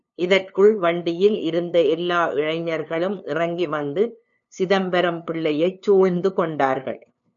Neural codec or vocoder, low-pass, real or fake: codec, 16 kHz, 2 kbps, FunCodec, trained on LibriTTS, 25 frames a second; 7.2 kHz; fake